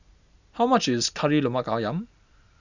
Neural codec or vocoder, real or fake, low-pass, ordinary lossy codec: none; real; 7.2 kHz; none